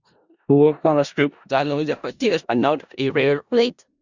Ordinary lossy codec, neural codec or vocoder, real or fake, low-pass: Opus, 64 kbps; codec, 16 kHz in and 24 kHz out, 0.4 kbps, LongCat-Audio-Codec, four codebook decoder; fake; 7.2 kHz